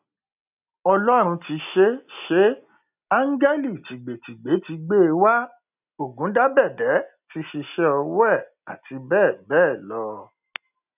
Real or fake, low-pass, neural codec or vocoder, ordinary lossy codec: real; 3.6 kHz; none; none